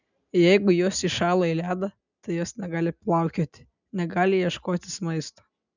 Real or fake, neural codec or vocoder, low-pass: real; none; 7.2 kHz